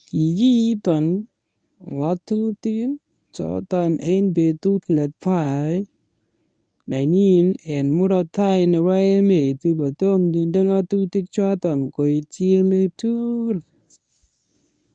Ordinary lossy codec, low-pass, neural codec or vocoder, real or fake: none; 9.9 kHz; codec, 24 kHz, 0.9 kbps, WavTokenizer, medium speech release version 1; fake